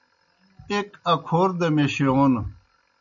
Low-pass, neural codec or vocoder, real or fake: 7.2 kHz; none; real